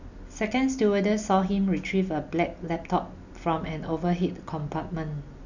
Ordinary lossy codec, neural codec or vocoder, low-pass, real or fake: none; none; 7.2 kHz; real